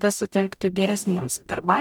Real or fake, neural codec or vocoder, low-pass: fake; codec, 44.1 kHz, 0.9 kbps, DAC; 19.8 kHz